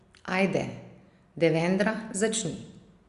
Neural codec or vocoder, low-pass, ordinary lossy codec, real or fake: none; 10.8 kHz; Opus, 64 kbps; real